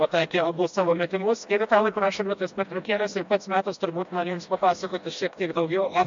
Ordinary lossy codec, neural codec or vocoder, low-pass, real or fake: MP3, 48 kbps; codec, 16 kHz, 1 kbps, FreqCodec, smaller model; 7.2 kHz; fake